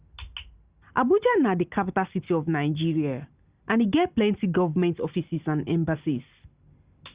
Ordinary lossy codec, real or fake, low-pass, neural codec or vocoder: Opus, 64 kbps; real; 3.6 kHz; none